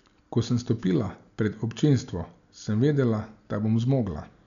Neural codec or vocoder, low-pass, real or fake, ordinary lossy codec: none; 7.2 kHz; real; none